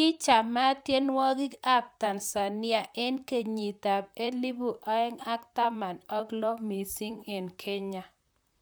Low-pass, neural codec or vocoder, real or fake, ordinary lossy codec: none; vocoder, 44.1 kHz, 128 mel bands, Pupu-Vocoder; fake; none